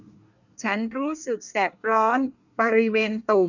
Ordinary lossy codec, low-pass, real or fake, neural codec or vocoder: none; 7.2 kHz; fake; codec, 24 kHz, 1 kbps, SNAC